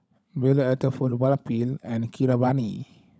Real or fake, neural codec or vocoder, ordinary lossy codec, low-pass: fake; codec, 16 kHz, 16 kbps, FunCodec, trained on LibriTTS, 50 frames a second; none; none